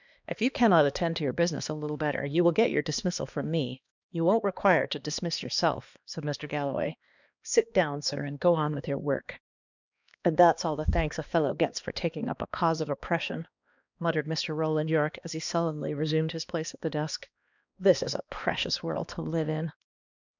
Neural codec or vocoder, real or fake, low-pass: codec, 16 kHz, 2 kbps, X-Codec, HuBERT features, trained on balanced general audio; fake; 7.2 kHz